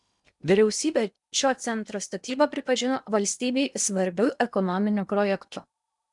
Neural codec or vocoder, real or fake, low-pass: codec, 16 kHz in and 24 kHz out, 0.8 kbps, FocalCodec, streaming, 65536 codes; fake; 10.8 kHz